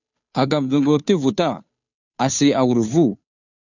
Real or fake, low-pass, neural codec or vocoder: fake; 7.2 kHz; codec, 16 kHz, 2 kbps, FunCodec, trained on Chinese and English, 25 frames a second